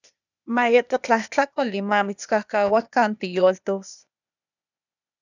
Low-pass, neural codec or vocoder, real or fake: 7.2 kHz; codec, 16 kHz, 0.8 kbps, ZipCodec; fake